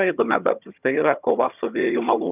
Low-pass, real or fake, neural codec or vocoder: 3.6 kHz; fake; vocoder, 22.05 kHz, 80 mel bands, HiFi-GAN